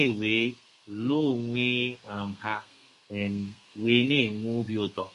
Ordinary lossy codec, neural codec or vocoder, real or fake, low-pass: MP3, 48 kbps; codec, 32 kHz, 1.9 kbps, SNAC; fake; 14.4 kHz